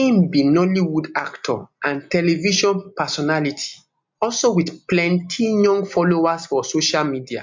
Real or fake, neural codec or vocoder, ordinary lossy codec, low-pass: real; none; MP3, 64 kbps; 7.2 kHz